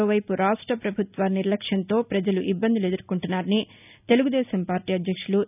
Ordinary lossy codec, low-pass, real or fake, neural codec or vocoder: none; 3.6 kHz; real; none